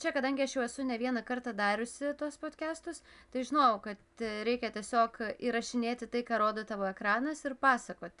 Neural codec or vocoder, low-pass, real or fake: none; 10.8 kHz; real